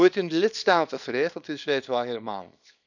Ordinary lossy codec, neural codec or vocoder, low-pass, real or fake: none; codec, 24 kHz, 0.9 kbps, WavTokenizer, small release; 7.2 kHz; fake